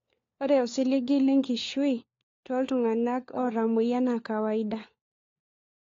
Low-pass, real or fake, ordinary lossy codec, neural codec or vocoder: 7.2 kHz; fake; AAC, 32 kbps; codec, 16 kHz, 4 kbps, FunCodec, trained on LibriTTS, 50 frames a second